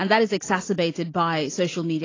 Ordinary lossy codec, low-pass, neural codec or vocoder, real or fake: AAC, 32 kbps; 7.2 kHz; none; real